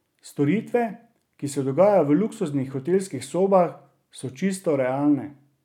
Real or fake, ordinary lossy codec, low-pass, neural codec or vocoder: real; none; 19.8 kHz; none